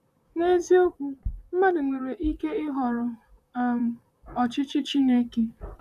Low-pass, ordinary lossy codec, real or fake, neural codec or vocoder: 14.4 kHz; none; fake; vocoder, 44.1 kHz, 128 mel bands, Pupu-Vocoder